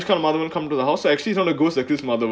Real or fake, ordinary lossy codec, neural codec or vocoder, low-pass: real; none; none; none